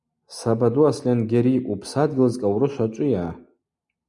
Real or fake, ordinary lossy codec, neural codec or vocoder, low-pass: real; Opus, 64 kbps; none; 10.8 kHz